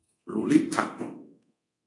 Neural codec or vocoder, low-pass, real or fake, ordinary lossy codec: codec, 24 kHz, 0.9 kbps, DualCodec; 10.8 kHz; fake; MP3, 96 kbps